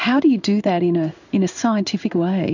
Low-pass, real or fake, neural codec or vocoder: 7.2 kHz; fake; codec, 16 kHz in and 24 kHz out, 1 kbps, XY-Tokenizer